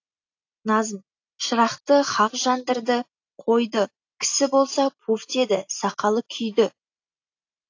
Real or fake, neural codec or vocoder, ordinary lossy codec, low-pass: real; none; AAC, 48 kbps; 7.2 kHz